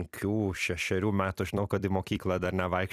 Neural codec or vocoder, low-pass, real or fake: vocoder, 44.1 kHz, 128 mel bands every 256 samples, BigVGAN v2; 14.4 kHz; fake